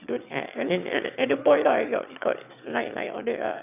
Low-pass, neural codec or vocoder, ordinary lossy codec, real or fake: 3.6 kHz; autoencoder, 22.05 kHz, a latent of 192 numbers a frame, VITS, trained on one speaker; none; fake